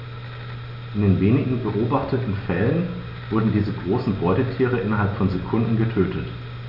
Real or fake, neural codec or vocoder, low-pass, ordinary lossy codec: real; none; 5.4 kHz; none